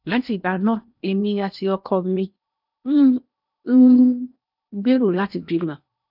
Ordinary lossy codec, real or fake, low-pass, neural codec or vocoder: none; fake; 5.4 kHz; codec, 16 kHz in and 24 kHz out, 0.8 kbps, FocalCodec, streaming, 65536 codes